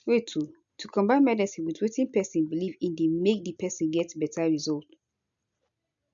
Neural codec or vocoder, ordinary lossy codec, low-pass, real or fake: none; MP3, 96 kbps; 7.2 kHz; real